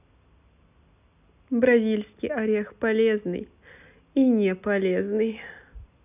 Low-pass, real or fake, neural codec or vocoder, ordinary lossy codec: 3.6 kHz; real; none; none